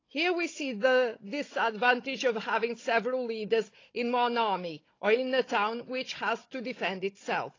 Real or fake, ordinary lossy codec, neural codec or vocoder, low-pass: fake; AAC, 32 kbps; codec, 16 kHz, 16 kbps, FunCodec, trained on Chinese and English, 50 frames a second; 7.2 kHz